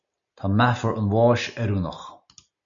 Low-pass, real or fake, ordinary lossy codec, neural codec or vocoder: 7.2 kHz; real; MP3, 96 kbps; none